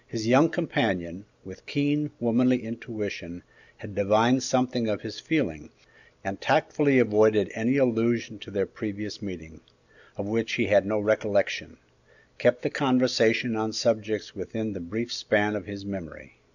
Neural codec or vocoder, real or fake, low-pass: none; real; 7.2 kHz